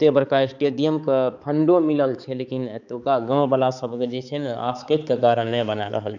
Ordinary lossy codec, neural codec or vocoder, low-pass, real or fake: none; codec, 16 kHz, 4 kbps, X-Codec, HuBERT features, trained on balanced general audio; 7.2 kHz; fake